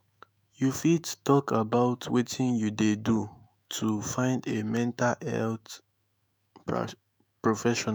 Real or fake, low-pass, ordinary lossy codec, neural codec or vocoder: fake; none; none; autoencoder, 48 kHz, 128 numbers a frame, DAC-VAE, trained on Japanese speech